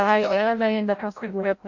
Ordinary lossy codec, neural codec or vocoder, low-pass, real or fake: MP3, 48 kbps; codec, 16 kHz, 0.5 kbps, FreqCodec, larger model; 7.2 kHz; fake